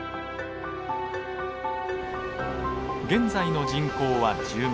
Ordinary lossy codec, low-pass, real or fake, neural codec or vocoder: none; none; real; none